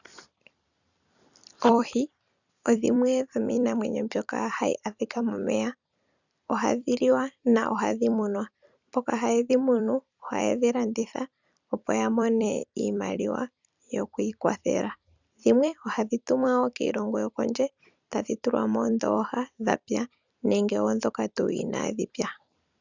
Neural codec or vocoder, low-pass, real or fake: vocoder, 44.1 kHz, 128 mel bands every 256 samples, BigVGAN v2; 7.2 kHz; fake